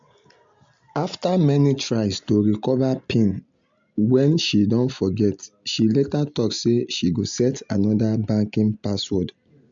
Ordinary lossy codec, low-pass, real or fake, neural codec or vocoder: MP3, 64 kbps; 7.2 kHz; real; none